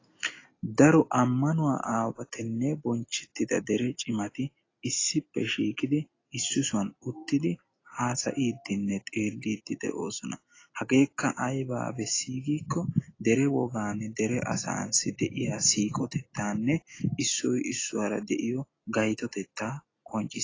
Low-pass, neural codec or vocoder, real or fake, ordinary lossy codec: 7.2 kHz; none; real; AAC, 32 kbps